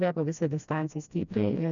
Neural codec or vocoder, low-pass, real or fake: codec, 16 kHz, 1 kbps, FreqCodec, smaller model; 7.2 kHz; fake